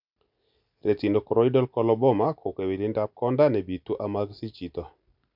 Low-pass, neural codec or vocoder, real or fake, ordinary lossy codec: 5.4 kHz; none; real; none